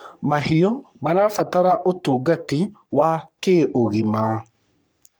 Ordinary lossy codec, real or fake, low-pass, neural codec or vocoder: none; fake; none; codec, 44.1 kHz, 3.4 kbps, Pupu-Codec